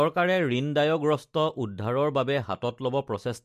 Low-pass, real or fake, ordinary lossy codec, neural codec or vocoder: 14.4 kHz; real; MP3, 64 kbps; none